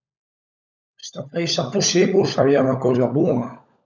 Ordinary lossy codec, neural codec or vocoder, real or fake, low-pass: none; codec, 16 kHz, 16 kbps, FunCodec, trained on LibriTTS, 50 frames a second; fake; 7.2 kHz